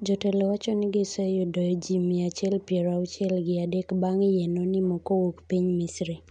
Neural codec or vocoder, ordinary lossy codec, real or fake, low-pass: none; none; real; 9.9 kHz